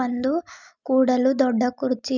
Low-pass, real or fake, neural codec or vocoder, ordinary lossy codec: 7.2 kHz; real; none; none